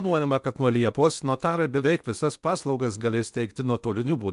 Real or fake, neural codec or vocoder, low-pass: fake; codec, 16 kHz in and 24 kHz out, 0.8 kbps, FocalCodec, streaming, 65536 codes; 10.8 kHz